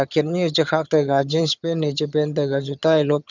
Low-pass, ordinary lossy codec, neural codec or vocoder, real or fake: 7.2 kHz; none; vocoder, 22.05 kHz, 80 mel bands, HiFi-GAN; fake